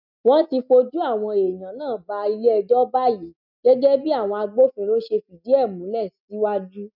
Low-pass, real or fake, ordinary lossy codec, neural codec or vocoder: 5.4 kHz; real; none; none